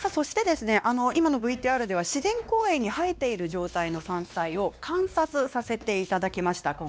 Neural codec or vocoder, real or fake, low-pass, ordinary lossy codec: codec, 16 kHz, 2 kbps, X-Codec, WavLM features, trained on Multilingual LibriSpeech; fake; none; none